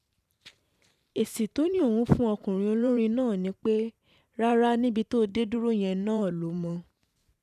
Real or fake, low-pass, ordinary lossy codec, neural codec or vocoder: fake; 14.4 kHz; none; vocoder, 44.1 kHz, 128 mel bands every 512 samples, BigVGAN v2